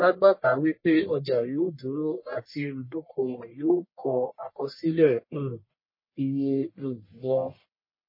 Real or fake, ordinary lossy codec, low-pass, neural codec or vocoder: fake; MP3, 24 kbps; 5.4 kHz; codec, 44.1 kHz, 1.7 kbps, Pupu-Codec